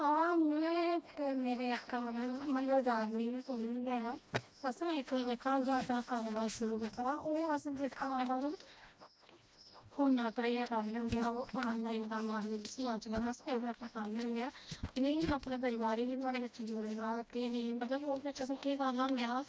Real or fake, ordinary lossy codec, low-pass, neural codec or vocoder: fake; none; none; codec, 16 kHz, 1 kbps, FreqCodec, smaller model